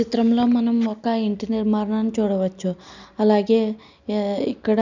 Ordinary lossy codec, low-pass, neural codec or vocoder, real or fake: none; 7.2 kHz; none; real